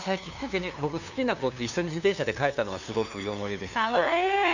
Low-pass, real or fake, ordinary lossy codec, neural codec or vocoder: 7.2 kHz; fake; none; codec, 16 kHz, 2 kbps, FunCodec, trained on LibriTTS, 25 frames a second